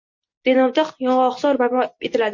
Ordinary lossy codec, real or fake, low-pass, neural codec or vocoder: MP3, 48 kbps; real; 7.2 kHz; none